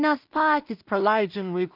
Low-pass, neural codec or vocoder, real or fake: 5.4 kHz; codec, 16 kHz in and 24 kHz out, 0.4 kbps, LongCat-Audio-Codec, two codebook decoder; fake